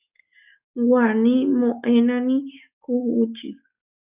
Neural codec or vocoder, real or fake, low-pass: codec, 44.1 kHz, 7.8 kbps, DAC; fake; 3.6 kHz